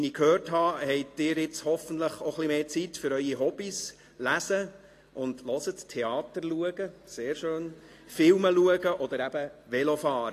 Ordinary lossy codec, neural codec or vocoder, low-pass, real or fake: AAC, 48 kbps; none; 14.4 kHz; real